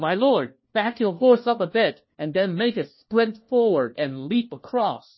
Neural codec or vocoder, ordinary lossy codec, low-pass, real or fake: codec, 16 kHz, 1 kbps, FunCodec, trained on LibriTTS, 50 frames a second; MP3, 24 kbps; 7.2 kHz; fake